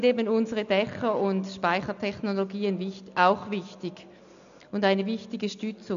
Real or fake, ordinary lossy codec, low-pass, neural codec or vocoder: real; MP3, 96 kbps; 7.2 kHz; none